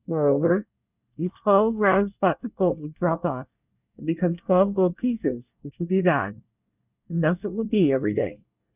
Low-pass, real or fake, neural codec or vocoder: 3.6 kHz; fake; codec, 24 kHz, 1 kbps, SNAC